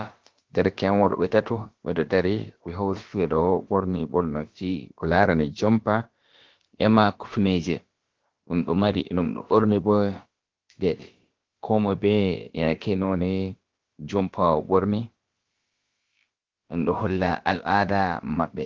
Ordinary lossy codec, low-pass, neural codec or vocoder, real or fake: Opus, 16 kbps; 7.2 kHz; codec, 16 kHz, about 1 kbps, DyCAST, with the encoder's durations; fake